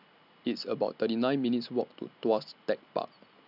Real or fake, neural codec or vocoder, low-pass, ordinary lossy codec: real; none; 5.4 kHz; none